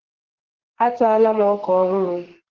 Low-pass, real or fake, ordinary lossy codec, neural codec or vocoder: 7.2 kHz; fake; Opus, 16 kbps; codec, 32 kHz, 1.9 kbps, SNAC